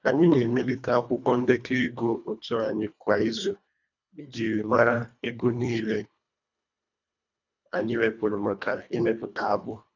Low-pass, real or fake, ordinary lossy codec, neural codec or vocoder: 7.2 kHz; fake; none; codec, 24 kHz, 1.5 kbps, HILCodec